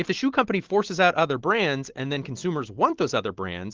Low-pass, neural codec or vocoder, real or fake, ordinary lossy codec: 7.2 kHz; none; real; Opus, 16 kbps